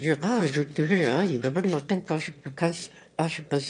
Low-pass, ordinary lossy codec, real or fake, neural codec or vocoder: 9.9 kHz; MP3, 64 kbps; fake; autoencoder, 22.05 kHz, a latent of 192 numbers a frame, VITS, trained on one speaker